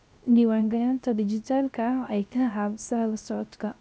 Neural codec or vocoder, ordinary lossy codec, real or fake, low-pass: codec, 16 kHz, 0.3 kbps, FocalCodec; none; fake; none